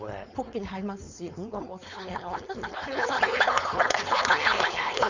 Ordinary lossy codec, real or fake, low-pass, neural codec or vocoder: Opus, 64 kbps; fake; 7.2 kHz; codec, 16 kHz, 4.8 kbps, FACodec